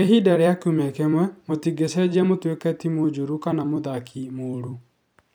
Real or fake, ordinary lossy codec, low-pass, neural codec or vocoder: fake; none; none; vocoder, 44.1 kHz, 128 mel bands every 256 samples, BigVGAN v2